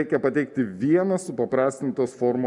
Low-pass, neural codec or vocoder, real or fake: 10.8 kHz; none; real